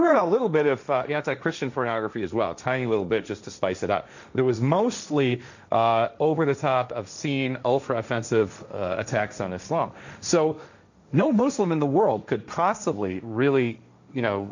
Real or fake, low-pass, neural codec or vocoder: fake; 7.2 kHz; codec, 16 kHz, 1.1 kbps, Voila-Tokenizer